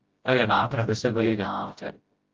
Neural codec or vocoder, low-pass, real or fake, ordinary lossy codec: codec, 16 kHz, 0.5 kbps, FreqCodec, smaller model; 7.2 kHz; fake; Opus, 32 kbps